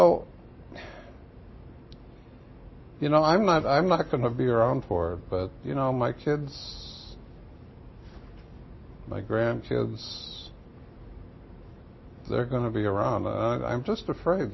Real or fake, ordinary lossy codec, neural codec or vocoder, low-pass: real; MP3, 24 kbps; none; 7.2 kHz